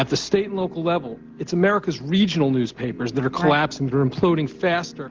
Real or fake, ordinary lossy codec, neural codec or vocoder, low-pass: real; Opus, 16 kbps; none; 7.2 kHz